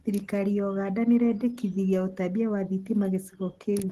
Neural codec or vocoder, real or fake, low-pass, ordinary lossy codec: none; real; 14.4 kHz; Opus, 16 kbps